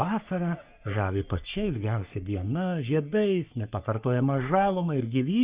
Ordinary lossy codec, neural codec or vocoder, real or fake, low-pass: Opus, 64 kbps; codec, 44.1 kHz, 3.4 kbps, Pupu-Codec; fake; 3.6 kHz